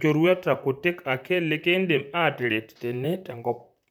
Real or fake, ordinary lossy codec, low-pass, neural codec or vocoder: fake; none; none; vocoder, 44.1 kHz, 128 mel bands, Pupu-Vocoder